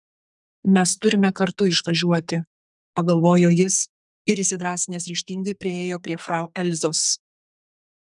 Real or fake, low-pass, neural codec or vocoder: fake; 10.8 kHz; codec, 44.1 kHz, 2.6 kbps, SNAC